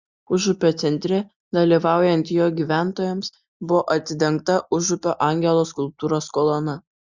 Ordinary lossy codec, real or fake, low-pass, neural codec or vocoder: Opus, 64 kbps; real; 7.2 kHz; none